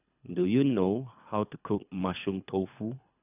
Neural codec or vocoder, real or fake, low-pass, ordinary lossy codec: codec, 24 kHz, 3 kbps, HILCodec; fake; 3.6 kHz; none